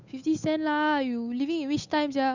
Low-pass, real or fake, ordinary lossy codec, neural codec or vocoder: 7.2 kHz; fake; none; codec, 16 kHz, 8 kbps, FunCodec, trained on Chinese and English, 25 frames a second